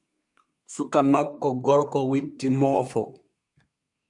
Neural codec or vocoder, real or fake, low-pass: codec, 24 kHz, 1 kbps, SNAC; fake; 10.8 kHz